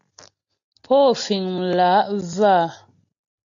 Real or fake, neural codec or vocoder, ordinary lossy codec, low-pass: real; none; AAC, 64 kbps; 7.2 kHz